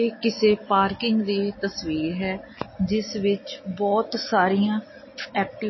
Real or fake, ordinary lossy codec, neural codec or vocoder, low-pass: real; MP3, 24 kbps; none; 7.2 kHz